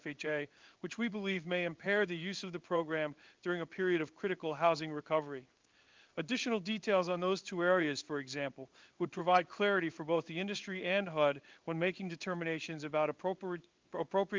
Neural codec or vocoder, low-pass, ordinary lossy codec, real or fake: codec, 16 kHz in and 24 kHz out, 1 kbps, XY-Tokenizer; 7.2 kHz; Opus, 32 kbps; fake